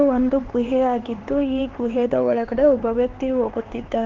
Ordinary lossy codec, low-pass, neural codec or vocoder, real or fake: Opus, 16 kbps; 7.2 kHz; codec, 16 kHz, 4 kbps, X-Codec, HuBERT features, trained on LibriSpeech; fake